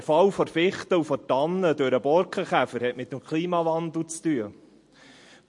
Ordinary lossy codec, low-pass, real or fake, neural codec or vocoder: MP3, 48 kbps; 10.8 kHz; real; none